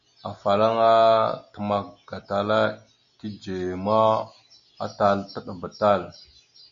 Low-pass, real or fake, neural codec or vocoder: 7.2 kHz; real; none